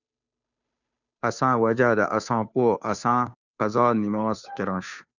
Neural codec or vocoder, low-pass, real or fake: codec, 16 kHz, 2 kbps, FunCodec, trained on Chinese and English, 25 frames a second; 7.2 kHz; fake